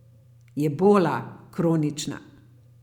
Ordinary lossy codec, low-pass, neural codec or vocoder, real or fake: none; 19.8 kHz; vocoder, 44.1 kHz, 128 mel bands every 512 samples, BigVGAN v2; fake